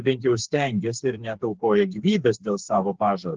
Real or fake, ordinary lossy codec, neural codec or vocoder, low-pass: fake; Opus, 16 kbps; codec, 16 kHz, 4 kbps, FreqCodec, smaller model; 7.2 kHz